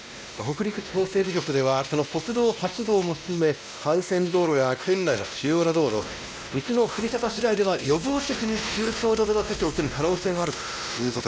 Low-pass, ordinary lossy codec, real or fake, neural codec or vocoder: none; none; fake; codec, 16 kHz, 1 kbps, X-Codec, WavLM features, trained on Multilingual LibriSpeech